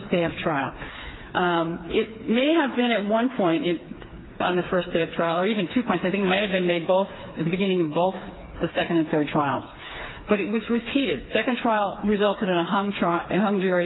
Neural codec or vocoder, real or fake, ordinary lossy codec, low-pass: codec, 16 kHz, 4 kbps, FreqCodec, smaller model; fake; AAC, 16 kbps; 7.2 kHz